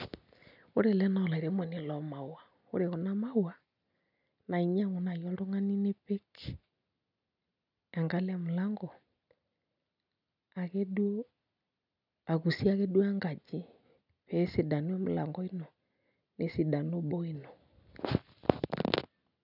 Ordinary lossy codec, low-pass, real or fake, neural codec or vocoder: none; 5.4 kHz; real; none